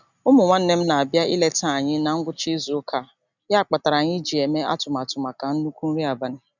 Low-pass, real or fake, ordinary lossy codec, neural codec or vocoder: 7.2 kHz; real; none; none